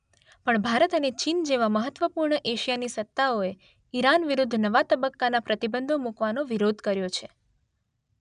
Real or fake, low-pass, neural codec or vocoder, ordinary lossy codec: real; 9.9 kHz; none; none